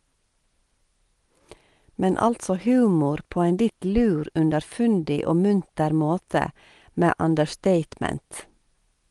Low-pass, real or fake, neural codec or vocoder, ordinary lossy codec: 10.8 kHz; real; none; Opus, 24 kbps